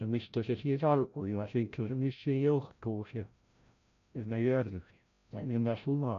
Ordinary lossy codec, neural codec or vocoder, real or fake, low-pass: none; codec, 16 kHz, 0.5 kbps, FreqCodec, larger model; fake; 7.2 kHz